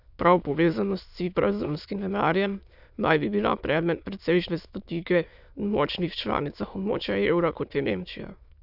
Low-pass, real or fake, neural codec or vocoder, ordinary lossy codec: 5.4 kHz; fake; autoencoder, 22.05 kHz, a latent of 192 numbers a frame, VITS, trained on many speakers; none